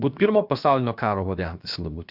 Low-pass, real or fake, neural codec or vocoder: 5.4 kHz; fake; codec, 16 kHz, about 1 kbps, DyCAST, with the encoder's durations